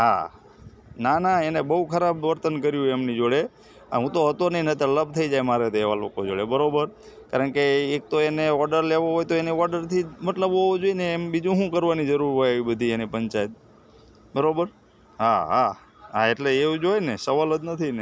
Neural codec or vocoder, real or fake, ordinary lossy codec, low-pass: none; real; none; none